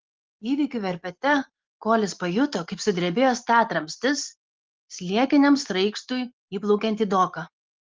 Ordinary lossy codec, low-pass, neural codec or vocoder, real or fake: Opus, 16 kbps; 7.2 kHz; none; real